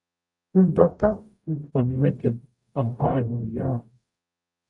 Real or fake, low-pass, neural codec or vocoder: fake; 10.8 kHz; codec, 44.1 kHz, 0.9 kbps, DAC